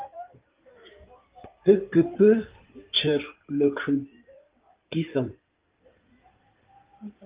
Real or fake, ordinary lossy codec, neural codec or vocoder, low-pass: fake; Opus, 32 kbps; codec, 16 kHz in and 24 kHz out, 2.2 kbps, FireRedTTS-2 codec; 3.6 kHz